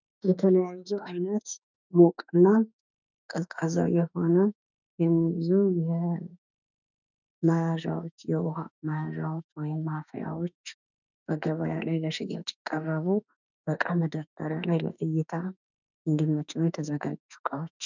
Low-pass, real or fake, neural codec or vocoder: 7.2 kHz; fake; autoencoder, 48 kHz, 32 numbers a frame, DAC-VAE, trained on Japanese speech